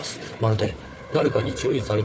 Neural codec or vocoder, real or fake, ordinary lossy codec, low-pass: codec, 16 kHz, 4 kbps, FunCodec, trained on Chinese and English, 50 frames a second; fake; none; none